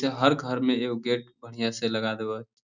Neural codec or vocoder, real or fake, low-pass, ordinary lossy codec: none; real; 7.2 kHz; none